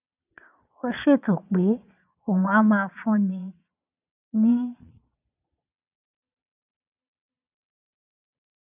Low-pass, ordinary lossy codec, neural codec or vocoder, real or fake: 3.6 kHz; none; vocoder, 22.05 kHz, 80 mel bands, Vocos; fake